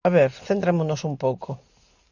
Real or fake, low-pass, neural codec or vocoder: real; 7.2 kHz; none